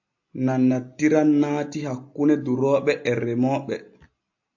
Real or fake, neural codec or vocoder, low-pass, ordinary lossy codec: real; none; 7.2 kHz; AAC, 48 kbps